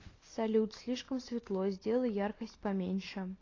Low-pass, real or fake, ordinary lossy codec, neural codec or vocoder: 7.2 kHz; real; AAC, 32 kbps; none